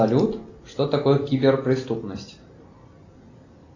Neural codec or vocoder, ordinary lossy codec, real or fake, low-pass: none; AAC, 48 kbps; real; 7.2 kHz